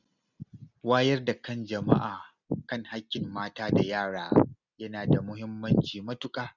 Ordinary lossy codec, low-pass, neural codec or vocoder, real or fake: none; 7.2 kHz; none; real